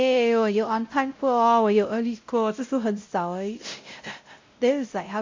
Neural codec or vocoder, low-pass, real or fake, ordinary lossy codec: codec, 16 kHz, 0.5 kbps, X-Codec, WavLM features, trained on Multilingual LibriSpeech; 7.2 kHz; fake; MP3, 48 kbps